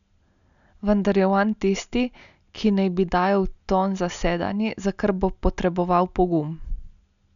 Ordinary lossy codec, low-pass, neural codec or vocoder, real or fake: none; 7.2 kHz; none; real